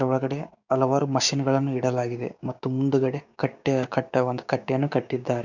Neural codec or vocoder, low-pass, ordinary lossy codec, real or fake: codec, 16 kHz, 6 kbps, DAC; 7.2 kHz; none; fake